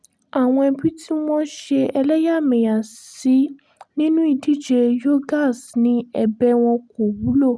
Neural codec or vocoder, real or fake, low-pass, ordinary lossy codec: none; real; none; none